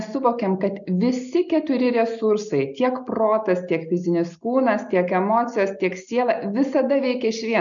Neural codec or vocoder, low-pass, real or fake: none; 7.2 kHz; real